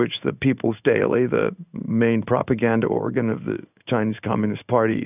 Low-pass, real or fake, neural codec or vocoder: 3.6 kHz; fake; codec, 16 kHz in and 24 kHz out, 1 kbps, XY-Tokenizer